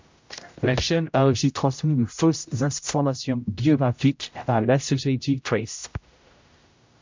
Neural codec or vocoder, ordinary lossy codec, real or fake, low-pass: codec, 16 kHz, 0.5 kbps, X-Codec, HuBERT features, trained on general audio; MP3, 64 kbps; fake; 7.2 kHz